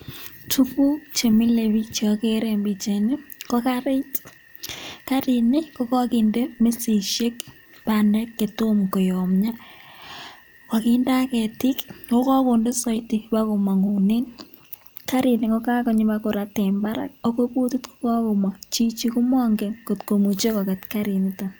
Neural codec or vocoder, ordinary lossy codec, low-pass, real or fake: none; none; none; real